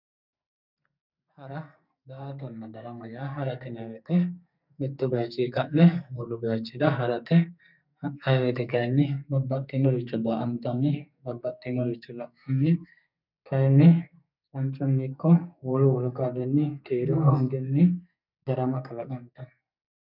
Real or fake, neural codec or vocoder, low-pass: fake; codec, 44.1 kHz, 2.6 kbps, SNAC; 5.4 kHz